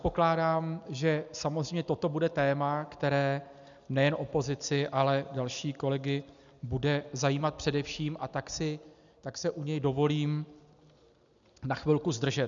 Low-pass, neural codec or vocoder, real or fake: 7.2 kHz; none; real